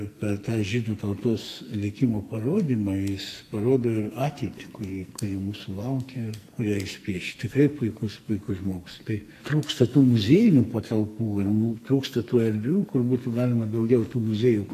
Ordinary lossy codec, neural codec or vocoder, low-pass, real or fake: AAC, 64 kbps; codec, 44.1 kHz, 2.6 kbps, SNAC; 14.4 kHz; fake